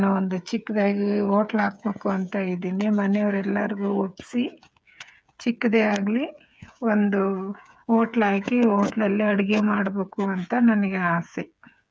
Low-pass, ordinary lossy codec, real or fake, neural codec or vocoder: none; none; fake; codec, 16 kHz, 8 kbps, FreqCodec, smaller model